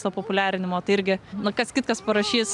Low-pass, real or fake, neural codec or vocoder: 10.8 kHz; real; none